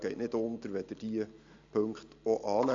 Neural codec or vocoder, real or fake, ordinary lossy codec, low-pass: none; real; AAC, 48 kbps; 7.2 kHz